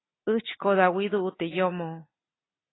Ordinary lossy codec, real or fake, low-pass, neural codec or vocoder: AAC, 16 kbps; real; 7.2 kHz; none